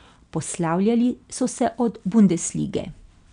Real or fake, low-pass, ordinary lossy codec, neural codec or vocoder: real; 9.9 kHz; none; none